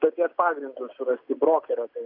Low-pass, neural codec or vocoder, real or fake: 5.4 kHz; none; real